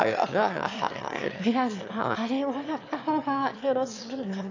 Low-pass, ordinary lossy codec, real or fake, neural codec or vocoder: 7.2 kHz; AAC, 48 kbps; fake; autoencoder, 22.05 kHz, a latent of 192 numbers a frame, VITS, trained on one speaker